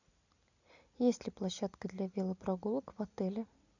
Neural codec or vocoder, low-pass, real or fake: none; 7.2 kHz; real